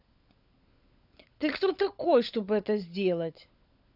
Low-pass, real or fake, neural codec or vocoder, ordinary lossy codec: 5.4 kHz; fake; codec, 16 kHz, 16 kbps, FunCodec, trained on LibriTTS, 50 frames a second; none